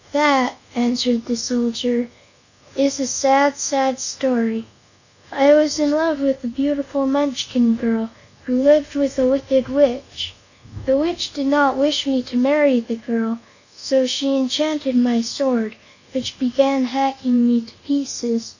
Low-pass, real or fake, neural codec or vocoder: 7.2 kHz; fake; codec, 24 kHz, 1.2 kbps, DualCodec